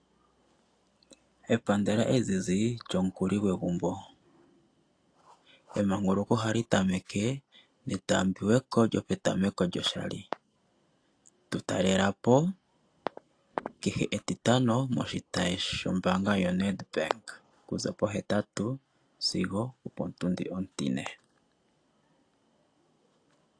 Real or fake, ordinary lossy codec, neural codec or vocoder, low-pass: real; AAC, 48 kbps; none; 9.9 kHz